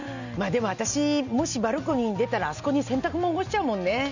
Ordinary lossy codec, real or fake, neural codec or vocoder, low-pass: none; real; none; 7.2 kHz